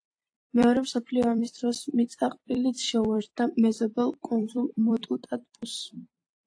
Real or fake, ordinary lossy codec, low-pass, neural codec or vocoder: fake; AAC, 48 kbps; 9.9 kHz; vocoder, 44.1 kHz, 128 mel bands every 256 samples, BigVGAN v2